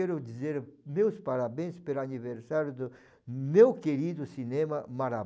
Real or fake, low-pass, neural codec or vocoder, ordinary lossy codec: real; none; none; none